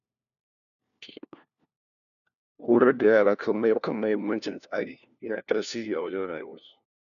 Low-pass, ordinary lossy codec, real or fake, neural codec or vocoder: 7.2 kHz; none; fake; codec, 16 kHz, 1 kbps, FunCodec, trained on LibriTTS, 50 frames a second